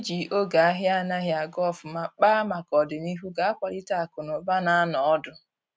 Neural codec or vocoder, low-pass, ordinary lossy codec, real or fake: none; none; none; real